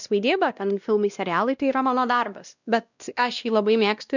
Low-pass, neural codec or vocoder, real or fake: 7.2 kHz; codec, 16 kHz, 1 kbps, X-Codec, WavLM features, trained on Multilingual LibriSpeech; fake